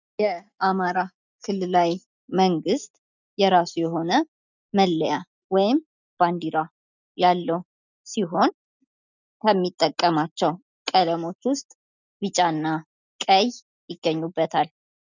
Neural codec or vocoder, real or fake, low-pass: none; real; 7.2 kHz